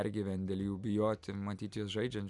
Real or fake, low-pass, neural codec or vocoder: real; 10.8 kHz; none